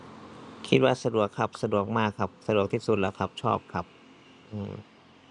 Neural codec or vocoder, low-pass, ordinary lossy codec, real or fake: none; 10.8 kHz; none; real